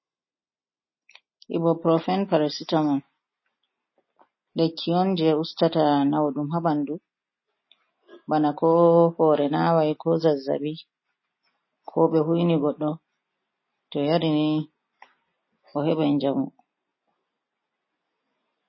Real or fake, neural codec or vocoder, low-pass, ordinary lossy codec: real; none; 7.2 kHz; MP3, 24 kbps